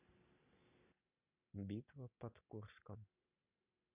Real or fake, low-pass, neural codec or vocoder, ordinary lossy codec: real; 3.6 kHz; none; none